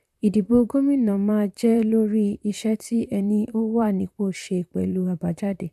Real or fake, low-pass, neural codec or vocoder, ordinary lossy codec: fake; 14.4 kHz; vocoder, 44.1 kHz, 128 mel bands, Pupu-Vocoder; AAC, 96 kbps